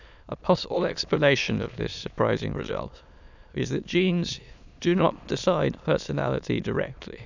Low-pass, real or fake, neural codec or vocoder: 7.2 kHz; fake; autoencoder, 22.05 kHz, a latent of 192 numbers a frame, VITS, trained on many speakers